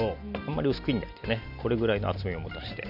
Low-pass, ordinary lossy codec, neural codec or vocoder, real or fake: 5.4 kHz; none; none; real